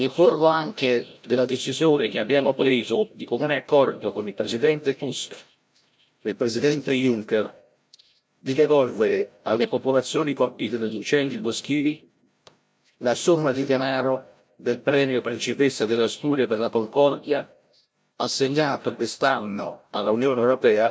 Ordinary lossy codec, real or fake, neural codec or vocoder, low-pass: none; fake; codec, 16 kHz, 0.5 kbps, FreqCodec, larger model; none